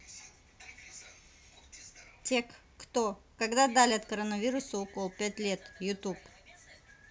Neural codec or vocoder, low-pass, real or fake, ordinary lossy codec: none; none; real; none